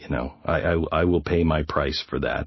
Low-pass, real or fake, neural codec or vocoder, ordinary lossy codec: 7.2 kHz; real; none; MP3, 24 kbps